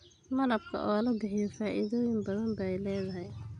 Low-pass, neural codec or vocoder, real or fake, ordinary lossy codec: 9.9 kHz; none; real; none